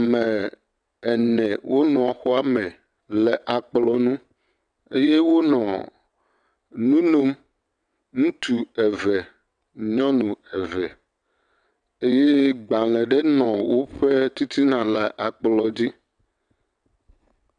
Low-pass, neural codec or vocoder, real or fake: 9.9 kHz; vocoder, 22.05 kHz, 80 mel bands, WaveNeXt; fake